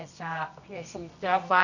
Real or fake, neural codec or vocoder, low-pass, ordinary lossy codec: fake; codec, 24 kHz, 0.9 kbps, WavTokenizer, medium music audio release; 7.2 kHz; none